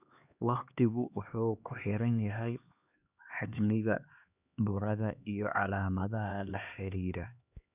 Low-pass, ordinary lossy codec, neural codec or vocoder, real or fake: 3.6 kHz; none; codec, 16 kHz, 2 kbps, X-Codec, HuBERT features, trained on LibriSpeech; fake